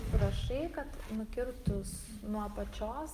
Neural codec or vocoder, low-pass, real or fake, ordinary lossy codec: none; 14.4 kHz; real; Opus, 32 kbps